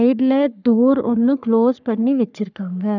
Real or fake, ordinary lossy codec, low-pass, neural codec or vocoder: fake; none; 7.2 kHz; codec, 44.1 kHz, 3.4 kbps, Pupu-Codec